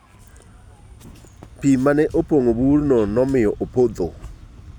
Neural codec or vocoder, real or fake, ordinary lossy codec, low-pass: none; real; none; 19.8 kHz